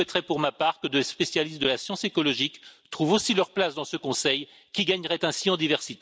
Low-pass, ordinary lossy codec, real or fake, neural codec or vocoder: none; none; real; none